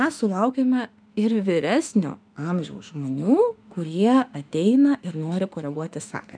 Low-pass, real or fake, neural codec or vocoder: 9.9 kHz; fake; autoencoder, 48 kHz, 32 numbers a frame, DAC-VAE, trained on Japanese speech